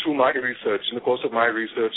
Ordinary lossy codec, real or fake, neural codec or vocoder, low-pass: AAC, 16 kbps; real; none; 7.2 kHz